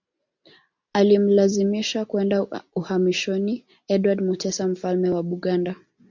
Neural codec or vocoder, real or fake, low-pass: none; real; 7.2 kHz